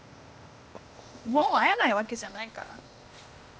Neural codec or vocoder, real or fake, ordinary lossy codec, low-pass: codec, 16 kHz, 0.8 kbps, ZipCodec; fake; none; none